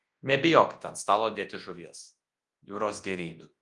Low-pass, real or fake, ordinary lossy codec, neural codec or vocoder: 10.8 kHz; fake; Opus, 24 kbps; codec, 24 kHz, 0.9 kbps, WavTokenizer, large speech release